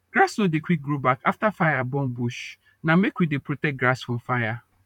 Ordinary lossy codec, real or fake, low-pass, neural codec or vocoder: none; fake; 19.8 kHz; vocoder, 44.1 kHz, 128 mel bands, Pupu-Vocoder